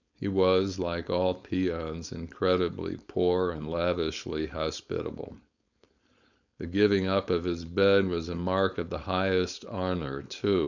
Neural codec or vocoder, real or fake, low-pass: codec, 16 kHz, 4.8 kbps, FACodec; fake; 7.2 kHz